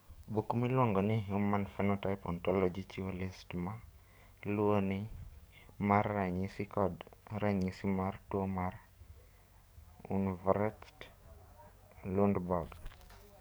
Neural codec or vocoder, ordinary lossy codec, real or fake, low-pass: codec, 44.1 kHz, 7.8 kbps, DAC; none; fake; none